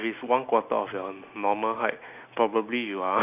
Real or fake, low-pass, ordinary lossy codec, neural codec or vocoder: real; 3.6 kHz; none; none